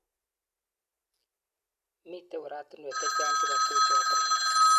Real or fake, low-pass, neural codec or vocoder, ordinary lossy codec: real; 14.4 kHz; none; none